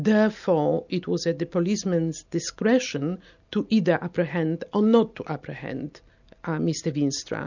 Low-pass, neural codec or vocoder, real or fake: 7.2 kHz; none; real